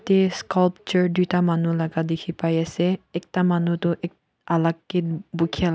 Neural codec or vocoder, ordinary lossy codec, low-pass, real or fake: none; none; none; real